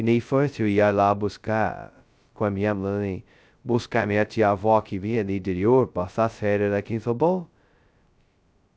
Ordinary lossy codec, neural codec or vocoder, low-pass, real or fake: none; codec, 16 kHz, 0.2 kbps, FocalCodec; none; fake